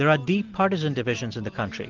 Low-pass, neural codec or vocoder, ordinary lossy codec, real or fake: 7.2 kHz; none; Opus, 16 kbps; real